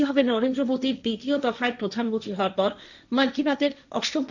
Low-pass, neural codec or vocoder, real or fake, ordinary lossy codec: 7.2 kHz; codec, 16 kHz, 1.1 kbps, Voila-Tokenizer; fake; none